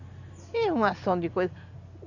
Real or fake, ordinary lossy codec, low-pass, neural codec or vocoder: real; none; 7.2 kHz; none